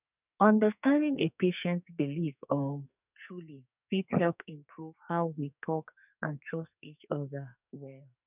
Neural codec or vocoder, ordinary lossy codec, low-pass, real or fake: codec, 44.1 kHz, 2.6 kbps, SNAC; none; 3.6 kHz; fake